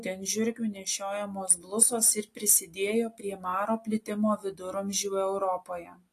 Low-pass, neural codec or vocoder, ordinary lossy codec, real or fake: 14.4 kHz; none; AAC, 48 kbps; real